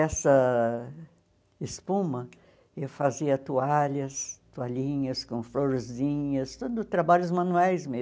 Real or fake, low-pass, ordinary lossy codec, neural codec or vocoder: real; none; none; none